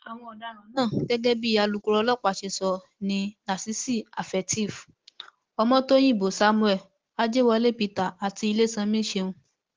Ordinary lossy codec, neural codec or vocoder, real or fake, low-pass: Opus, 16 kbps; none; real; 7.2 kHz